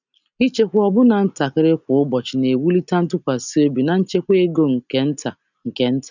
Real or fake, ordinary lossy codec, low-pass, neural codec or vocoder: real; none; 7.2 kHz; none